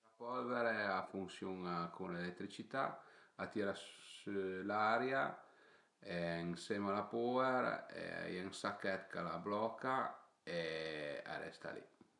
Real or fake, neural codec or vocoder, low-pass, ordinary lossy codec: real; none; 10.8 kHz; none